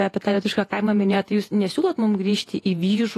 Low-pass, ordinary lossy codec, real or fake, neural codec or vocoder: 14.4 kHz; AAC, 48 kbps; fake; vocoder, 48 kHz, 128 mel bands, Vocos